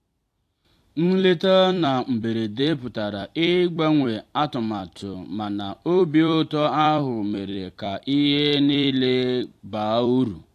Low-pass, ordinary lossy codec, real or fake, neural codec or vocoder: 14.4 kHz; AAC, 64 kbps; fake; vocoder, 44.1 kHz, 128 mel bands every 256 samples, BigVGAN v2